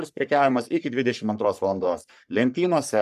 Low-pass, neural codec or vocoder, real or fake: 14.4 kHz; codec, 44.1 kHz, 3.4 kbps, Pupu-Codec; fake